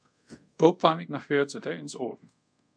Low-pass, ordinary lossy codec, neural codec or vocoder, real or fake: 9.9 kHz; MP3, 96 kbps; codec, 24 kHz, 0.5 kbps, DualCodec; fake